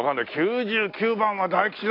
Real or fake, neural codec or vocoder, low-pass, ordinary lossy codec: real; none; 5.4 kHz; AAC, 32 kbps